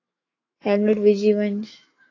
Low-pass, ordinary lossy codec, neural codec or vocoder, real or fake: 7.2 kHz; AAC, 32 kbps; autoencoder, 48 kHz, 128 numbers a frame, DAC-VAE, trained on Japanese speech; fake